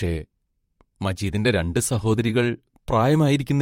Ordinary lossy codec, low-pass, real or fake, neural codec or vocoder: MP3, 64 kbps; 19.8 kHz; fake; vocoder, 44.1 kHz, 128 mel bands, Pupu-Vocoder